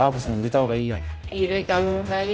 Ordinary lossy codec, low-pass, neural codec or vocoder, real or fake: none; none; codec, 16 kHz, 0.5 kbps, X-Codec, HuBERT features, trained on general audio; fake